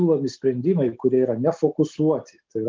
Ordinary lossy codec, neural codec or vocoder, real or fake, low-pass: Opus, 32 kbps; none; real; 7.2 kHz